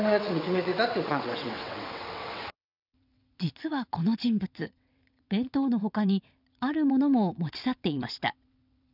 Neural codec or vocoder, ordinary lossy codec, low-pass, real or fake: vocoder, 22.05 kHz, 80 mel bands, WaveNeXt; none; 5.4 kHz; fake